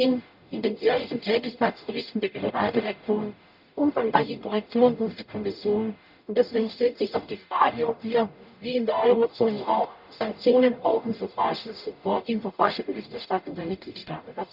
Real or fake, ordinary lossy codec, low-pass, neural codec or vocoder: fake; none; 5.4 kHz; codec, 44.1 kHz, 0.9 kbps, DAC